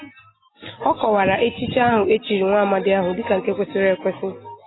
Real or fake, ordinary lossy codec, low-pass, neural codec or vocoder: real; AAC, 16 kbps; 7.2 kHz; none